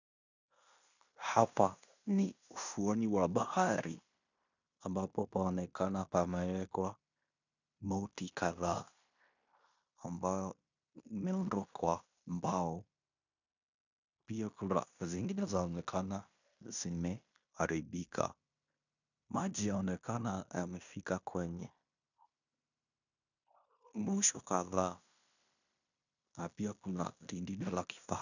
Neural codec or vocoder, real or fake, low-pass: codec, 16 kHz in and 24 kHz out, 0.9 kbps, LongCat-Audio-Codec, fine tuned four codebook decoder; fake; 7.2 kHz